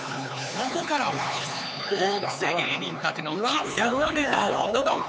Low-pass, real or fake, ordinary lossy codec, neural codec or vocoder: none; fake; none; codec, 16 kHz, 4 kbps, X-Codec, HuBERT features, trained on LibriSpeech